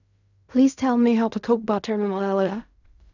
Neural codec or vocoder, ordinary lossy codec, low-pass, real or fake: codec, 16 kHz in and 24 kHz out, 0.4 kbps, LongCat-Audio-Codec, fine tuned four codebook decoder; none; 7.2 kHz; fake